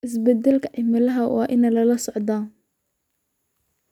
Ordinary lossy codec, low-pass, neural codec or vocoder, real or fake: none; 19.8 kHz; none; real